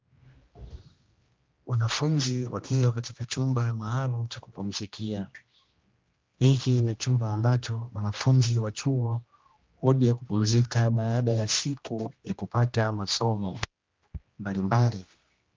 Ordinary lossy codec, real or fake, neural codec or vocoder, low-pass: Opus, 32 kbps; fake; codec, 16 kHz, 1 kbps, X-Codec, HuBERT features, trained on general audio; 7.2 kHz